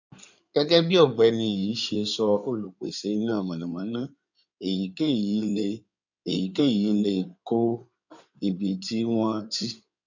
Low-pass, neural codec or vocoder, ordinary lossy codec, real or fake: 7.2 kHz; codec, 16 kHz in and 24 kHz out, 2.2 kbps, FireRedTTS-2 codec; none; fake